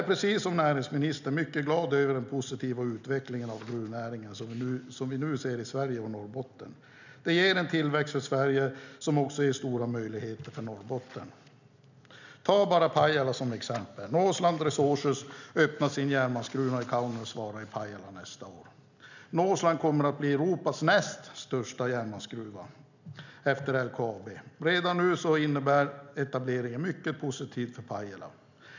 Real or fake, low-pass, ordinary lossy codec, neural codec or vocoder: real; 7.2 kHz; none; none